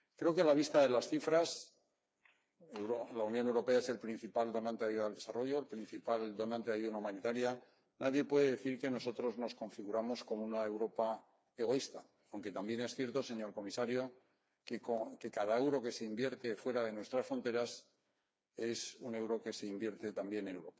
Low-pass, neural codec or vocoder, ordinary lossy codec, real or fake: none; codec, 16 kHz, 4 kbps, FreqCodec, smaller model; none; fake